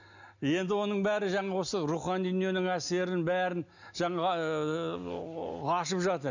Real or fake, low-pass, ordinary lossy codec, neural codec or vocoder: real; 7.2 kHz; none; none